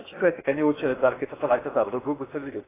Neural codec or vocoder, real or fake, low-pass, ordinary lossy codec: codec, 16 kHz in and 24 kHz out, 0.8 kbps, FocalCodec, streaming, 65536 codes; fake; 3.6 kHz; AAC, 16 kbps